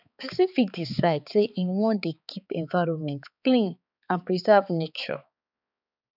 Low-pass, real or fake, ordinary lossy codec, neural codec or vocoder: 5.4 kHz; fake; none; codec, 16 kHz, 4 kbps, X-Codec, HuBERT features, trained on balanced general audio